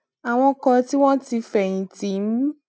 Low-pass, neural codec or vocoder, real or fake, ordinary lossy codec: none; none; real; none